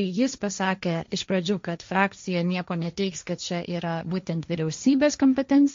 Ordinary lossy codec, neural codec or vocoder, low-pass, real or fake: MP3, 48 kbps; codec, 16 kHz, 1.1 kbps, Voila-Tokenizer; 7.2 kHz; fake